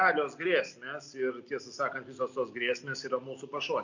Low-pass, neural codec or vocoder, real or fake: 7.2 kHz; none; real